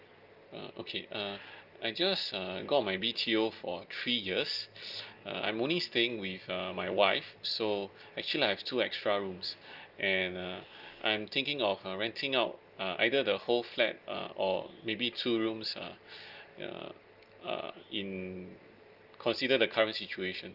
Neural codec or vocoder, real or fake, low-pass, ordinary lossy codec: none; real; 5.4 kHz; Opus, 32 kbps